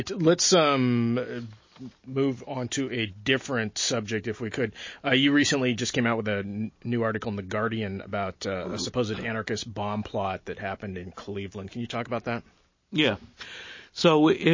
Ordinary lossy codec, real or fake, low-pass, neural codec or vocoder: MP3, 32 kbps; real; 7.2 kHz; none